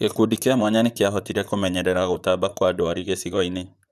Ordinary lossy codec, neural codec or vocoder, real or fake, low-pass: none; vocoder, 44.1 kHz, 128 mel bands, Pupu-Vocoder; fake; 14.4 kHz